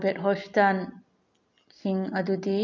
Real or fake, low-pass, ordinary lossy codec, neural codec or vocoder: real; 7.2 kHz; none; none